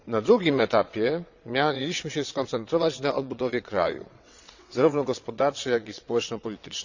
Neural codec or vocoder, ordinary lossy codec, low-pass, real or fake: vocoder, 22.05 kHz, 80 mel bands, WaveNeXt; Opus, 64 kbps; 7.2 kHz; fake